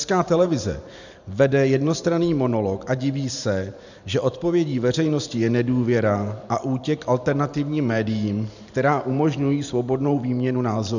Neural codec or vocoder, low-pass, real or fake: vocoder, 44.1 kHz, 128 mel bands every 512 samples, BigVGAN v2; 7.2 kHz; fake